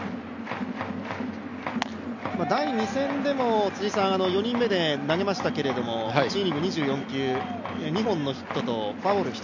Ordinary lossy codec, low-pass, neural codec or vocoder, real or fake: none; 7.2 kHz; none; real